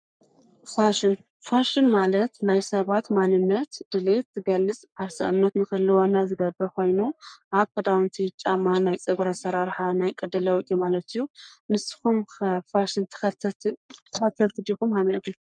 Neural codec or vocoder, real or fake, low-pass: codec, 44.1 kHz, 3.4 kbps, Pupu-Codec; fake; 9.9 kHz